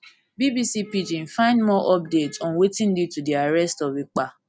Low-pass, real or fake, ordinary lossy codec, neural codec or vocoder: none; real; none; none